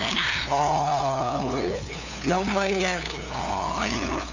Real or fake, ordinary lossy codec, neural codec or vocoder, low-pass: fake; none; codec, 16 kHz, 2 kbps, FunCodec, trained on LibriTTS, 25 frames a second; 7.2 kHz